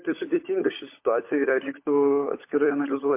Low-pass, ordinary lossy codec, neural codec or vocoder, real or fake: 3.6 kHz; MP3, 24 kbps; codec, 16 kHz, 16 kbps, FunCodec, trained on LibriTTS, 50 frames a second; fake